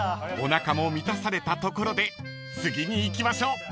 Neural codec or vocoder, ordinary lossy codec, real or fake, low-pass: none; none; real; none